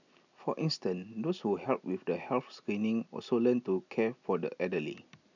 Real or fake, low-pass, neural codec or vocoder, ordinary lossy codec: real; 7.2 kHz; none; none